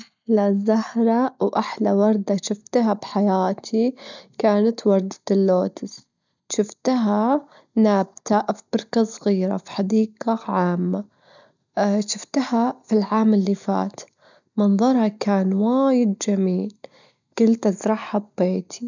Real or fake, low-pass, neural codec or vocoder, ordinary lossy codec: real; 7.2 kHz; none; none